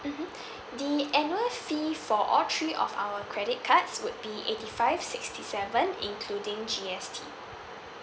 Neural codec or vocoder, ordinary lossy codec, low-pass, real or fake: none; none; none; real